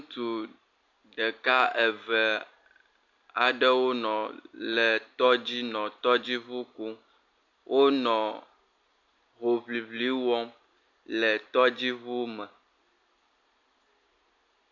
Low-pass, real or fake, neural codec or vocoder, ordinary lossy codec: 7.2 kHz; real; none; AAC, 48 kbps